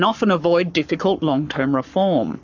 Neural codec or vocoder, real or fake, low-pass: codec, 44.1 kHz, 7.8 kbps, Pupu-Codec; fake; 7.2 kHz